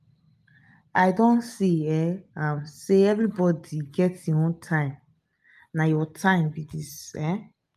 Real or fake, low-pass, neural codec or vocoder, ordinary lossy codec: real; 14.4 kHz; none; none